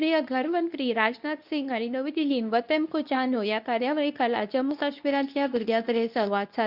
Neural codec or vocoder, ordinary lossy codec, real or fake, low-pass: codec, 24 kHz, 0.9 kbps, WavTokenizer, medium speech release version 2; none; fake; 5.4 kHz